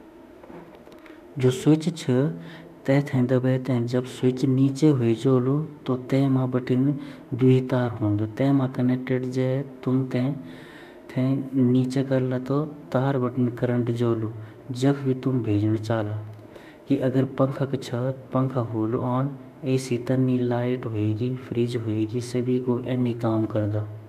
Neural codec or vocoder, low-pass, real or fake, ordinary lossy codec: autoencoder, 48 kHz, 32 numbers a frame, DAC-VAE, trained on Japanese speech; 14.4 kHz; fake; none